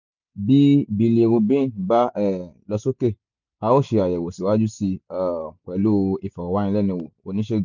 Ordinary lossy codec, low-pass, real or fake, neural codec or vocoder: none; 7.2 kHz; real; none